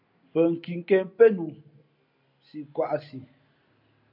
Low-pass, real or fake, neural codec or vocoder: 5.4 kHz; real; none